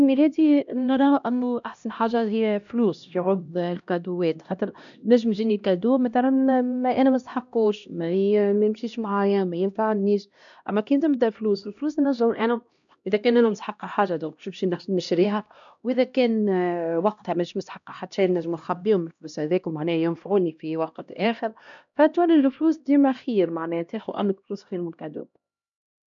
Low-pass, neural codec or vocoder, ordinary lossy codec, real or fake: 7.2 kHz; codec, 16 kHz, 1 kbps, X-Codec, HuBERT features, trained on LibriSpeech; none; fake